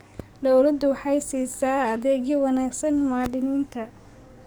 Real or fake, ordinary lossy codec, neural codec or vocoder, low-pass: fake; none; codec, 44.1 kHz, 7.8 kbps, DAC; none